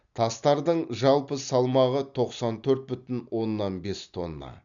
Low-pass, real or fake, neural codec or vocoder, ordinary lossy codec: 7.2 kHz; real; none; none